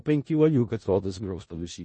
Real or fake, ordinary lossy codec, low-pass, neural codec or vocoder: fake; MP3, 32 kbps; 10.8 kHz; codec, 16 kHz in and 24 kHz out, 0.4 kbps, LongCat-Audio-Codec, four codebook decoder